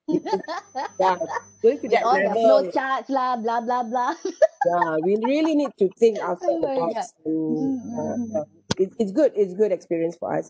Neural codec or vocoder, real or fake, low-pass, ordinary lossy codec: none; real; none; none